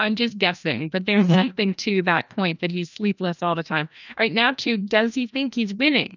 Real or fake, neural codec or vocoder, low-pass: fake; codec, 16 kHz, 1 kbps, FreqCodec, larger model; 7.2 kHz